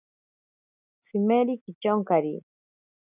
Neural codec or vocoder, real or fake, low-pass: none; real; 3.6 kHz